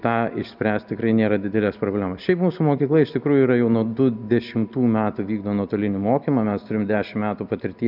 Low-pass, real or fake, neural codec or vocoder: 5.4 kHz; real; none